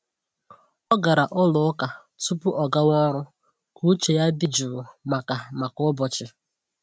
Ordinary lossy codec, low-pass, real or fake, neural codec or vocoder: none; none; real; none